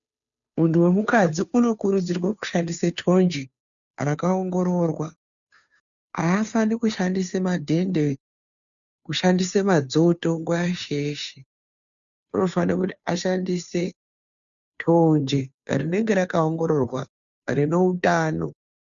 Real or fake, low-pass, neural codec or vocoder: fake; 7.2 kHz; codec, 16 kHz, 2 kbps, FunCodec, trained on Chinese and English, 25 frames a second